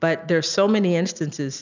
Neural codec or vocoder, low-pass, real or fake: none; 7.2 kHz; real